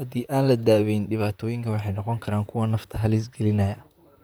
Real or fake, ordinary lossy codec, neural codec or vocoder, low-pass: fake; none; vocoder, 44.1 kHz, 128 mel bands, Pupu-Vocoder; none